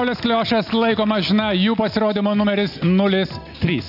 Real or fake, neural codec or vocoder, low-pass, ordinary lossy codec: real; none; 5.4 kHz; AAC, 48 kbps